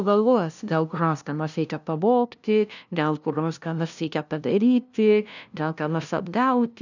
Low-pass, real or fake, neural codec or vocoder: 7.2 kHz; fake; codec, 16 kHz, 0.5 kbps, FunCodec, trained on LibriTTS, 25 frames a second